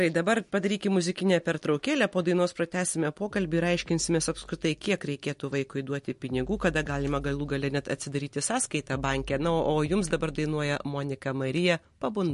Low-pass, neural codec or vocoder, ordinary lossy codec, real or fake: 14.4 kHz; none; MP3, 48 kbps; real